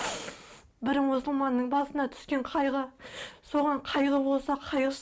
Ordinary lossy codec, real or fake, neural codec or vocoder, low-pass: none; real; none; none